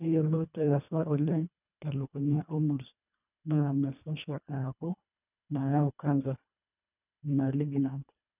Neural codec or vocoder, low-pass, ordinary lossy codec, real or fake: codec, 24 kHz, 1.5 kbps, HILCodec; 3.6 kHz; none; fake